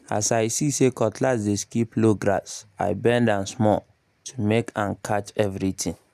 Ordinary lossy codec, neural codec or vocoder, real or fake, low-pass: none; none; real; 14.4 kHz